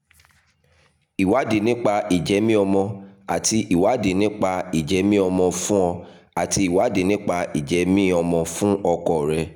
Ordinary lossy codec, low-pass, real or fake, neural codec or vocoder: none; 19.8 kHz; real; none